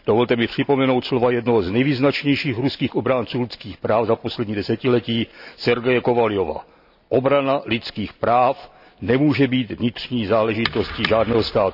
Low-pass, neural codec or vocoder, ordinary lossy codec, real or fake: 5.4 kHz; none; none; real